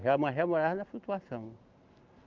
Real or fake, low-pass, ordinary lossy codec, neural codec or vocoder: real; 7.2 kHz; Opus, 32 kbps; none